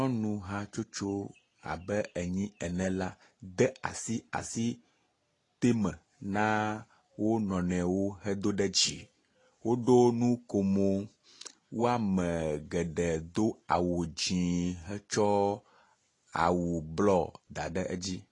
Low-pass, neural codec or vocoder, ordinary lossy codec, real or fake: 10.8 kHz; none; AAC, 32 kbps; real